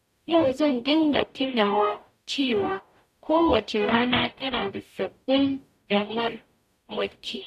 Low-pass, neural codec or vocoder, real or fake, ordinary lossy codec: 14.4 kHz; codec, 44.1 kHz, 0.9 kbps, DAC; fake; none